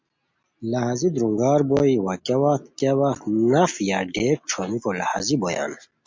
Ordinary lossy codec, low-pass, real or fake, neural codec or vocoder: MP3, 64 kbps; 7.2 kHz; real; none